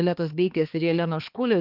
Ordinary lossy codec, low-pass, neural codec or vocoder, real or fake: Opus, 32 kbps; 5.4 kHz; codec, 16 kHz, 2 kbps, X-Codec, HuBERT features, trained on balanced general audio; fake